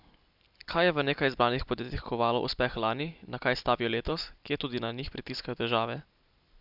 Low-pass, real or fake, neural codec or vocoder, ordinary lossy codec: 5.4 kHz; real; none; none